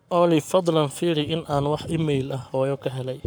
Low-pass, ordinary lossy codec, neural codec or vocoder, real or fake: none; none; codec, 44.1 kHz, 7.8 kbps, Pupu-Codec; fake